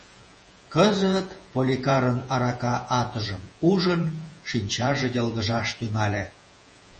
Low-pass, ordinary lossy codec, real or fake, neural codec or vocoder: 10.8 kHz; MP3, 32 kbps; fake; vocoder, 48 kHz, 128 mel bands, Vocos